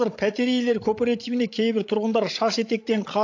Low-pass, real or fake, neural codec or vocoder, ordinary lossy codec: 7.2 kHz; fake; codec, 16 kHz, 16 kbps, FreqCodec, larger model; AAC, 48 kbps